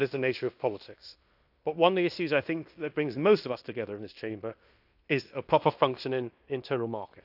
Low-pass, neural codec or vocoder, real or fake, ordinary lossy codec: 5.4 kHz; codec, 16 kHz in and 24 kHz out, 0.9 kbps, LongCat-Audio-Codec, fine tuned four codebook decoder; fake; none